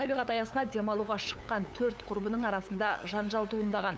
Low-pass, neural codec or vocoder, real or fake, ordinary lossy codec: none; codec, 16 kHz, 8 kbps, FunCodec, trained on LibriTTS, 25 frames a second; fake; none